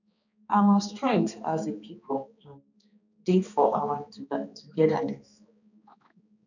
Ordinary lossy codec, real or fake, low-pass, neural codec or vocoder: none; fake; 7.2 kHz; codec, 16 kHz, 2 kbps, X-Codec, HuBERT features, trained on balanced general audio